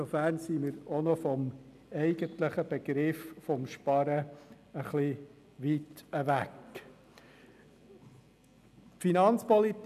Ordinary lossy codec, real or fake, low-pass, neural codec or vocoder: none; real; 14.4 kHz; none